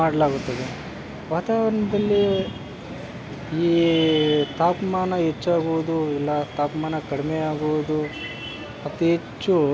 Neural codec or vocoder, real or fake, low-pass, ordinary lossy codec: none; real; none; none